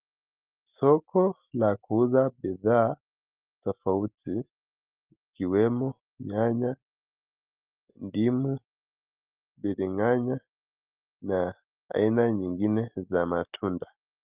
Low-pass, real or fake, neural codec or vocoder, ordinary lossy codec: 3.6 kHz; real; none; Opus, 32 kbps